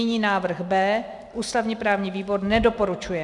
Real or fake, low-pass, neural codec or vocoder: real; 10.8 kHz; none